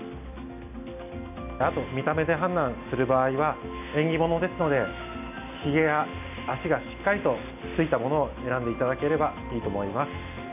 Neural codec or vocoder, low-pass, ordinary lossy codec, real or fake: none; 3.6 kHz; none; real